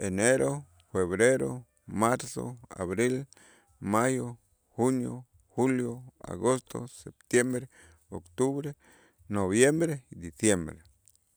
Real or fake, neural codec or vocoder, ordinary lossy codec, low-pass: real; none; none; none